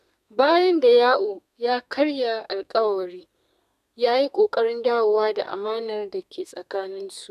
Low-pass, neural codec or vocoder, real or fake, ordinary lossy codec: 14.4 kHz; codec, 32 kHz, 1.9 kbps, SNAC; fake; none